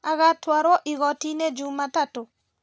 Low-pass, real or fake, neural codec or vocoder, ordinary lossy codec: none; real; none; none